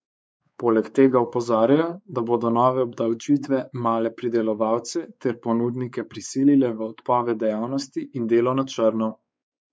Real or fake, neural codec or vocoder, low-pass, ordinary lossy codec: fake; codec, 16 kHz, 4 kbps, X-Codec, WavLM features, trained on Multilingual LibriSpeech; none; none